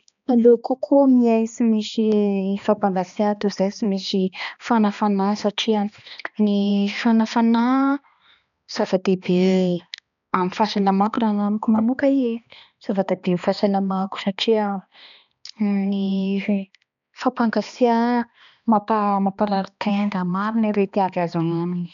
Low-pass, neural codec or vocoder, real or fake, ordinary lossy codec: 7.2 kHz; codec, 16 kHz, 2 kbps, X-Codec, HuBERT features, trained on balanced general audio; fake; none